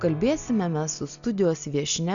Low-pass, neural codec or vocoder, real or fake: 7.2 kHz; none; real